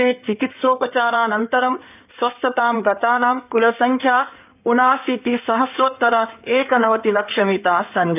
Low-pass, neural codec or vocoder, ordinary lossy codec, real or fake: 3.6 kHz; codec, 16 kHz in and 24 kHz out, 2.2 kbps, FireRedTTS-2 codec; none; fake